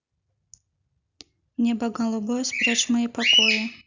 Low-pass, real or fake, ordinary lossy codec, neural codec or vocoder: 7.2 kHz; real; none; none